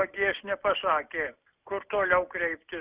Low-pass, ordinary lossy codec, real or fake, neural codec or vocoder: 3.6 kHz; Opus, 64 kbps; real; none